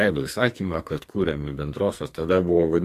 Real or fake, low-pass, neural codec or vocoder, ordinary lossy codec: fake; 14.4 kHz; codec, 44.1 kHz, 2.6 kbps, SNAC; AAC, 64 kbps